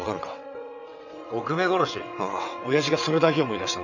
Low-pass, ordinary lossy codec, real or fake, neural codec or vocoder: 7.2 kHz; none; fake; vocoder, 22.05 kHz, 80 mel bands, WaveNeXt